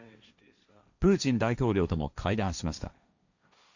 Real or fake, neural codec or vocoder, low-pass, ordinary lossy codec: fake; codec, 16 kHz, 1.1 kbps, Voila-Tokenizer; 7.2 kHz; none